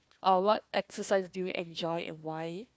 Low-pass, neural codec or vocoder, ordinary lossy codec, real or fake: none; codec, 16 kHz, 1 kbps, FunCodec, trained on Chinese and English, 50 frames a second; none; fake